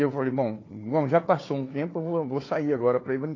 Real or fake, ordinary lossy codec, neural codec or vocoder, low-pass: fake; AAC, 32 kbps; codec, 16 kHz, 4 kbps, FunCodec, trained on Chinese and English, 50 frames a second; 7.2 kHz